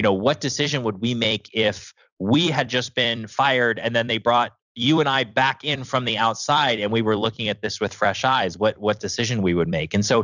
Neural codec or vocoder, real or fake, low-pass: vocoder, 44.1 kHz, 128 mel bands every 256 samples, BigVGAN v2; fake; 7.2 kHz